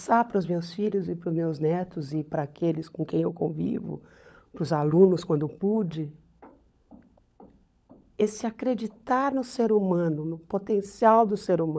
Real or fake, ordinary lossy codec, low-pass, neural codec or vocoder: fake; none; none; codec, 16 kHz, 16 kbps, FunCodec, trained on LibriTTS, 50 frames a second